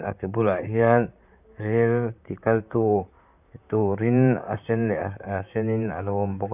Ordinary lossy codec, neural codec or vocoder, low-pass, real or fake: none; vocoder, 44.1 kHz, 128 mel bands, Pupu-Vocoder; 3.6 kHz; fake